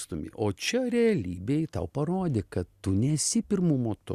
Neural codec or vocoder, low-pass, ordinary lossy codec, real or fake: none; 14.4 kHz; Opus, 64 kbps; real